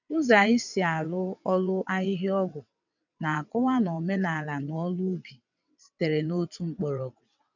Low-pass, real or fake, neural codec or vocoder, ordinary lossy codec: 7.2 kHz; fake; vocoder, 22.05 kHz, 80 mel bands, WaveNeXt; none